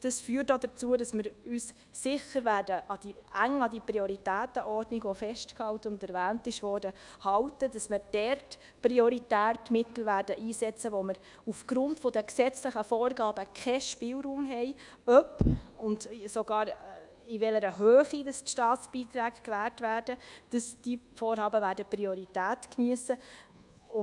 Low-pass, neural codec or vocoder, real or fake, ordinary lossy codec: 10.8 kHz; codec, 24 kHz, 1.2 kbps, DualCodec; fake; none